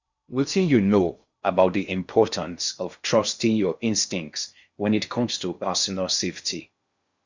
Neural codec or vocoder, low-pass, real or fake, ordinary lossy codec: codec, 16 kHz in and 24 kHz out, 0.6 kbps, FocalCodec, streaming, 2048 codes; 7.2 kHz; fake; Opus, 64 kbps